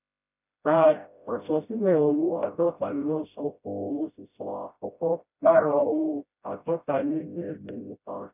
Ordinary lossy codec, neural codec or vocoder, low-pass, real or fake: none; codec, 16 kHz, 0.5 kbps, FreqCodec, smaller model; 3.6 kHz; fake